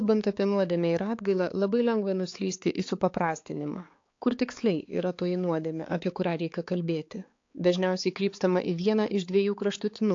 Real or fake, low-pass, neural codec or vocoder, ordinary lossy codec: fake; 7.2 kHz; codec, 16 kHz, 4 kbps, X-Codec, HuBERT features, trained on balanced general audio; AAC, 48 kbps